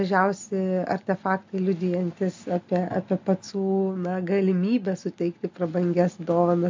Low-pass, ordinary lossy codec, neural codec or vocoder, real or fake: 7.2 kHz; MP3, 48 kbps; none; real